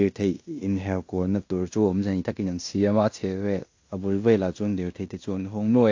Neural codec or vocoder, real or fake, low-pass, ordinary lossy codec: codec, 16 kHz in and 24 kHz out, 0.9 kbps, LongCat-Audio-Codec, fine tuned four codebook decoder; fake; 7.2 kHz; AAC, 48 kbps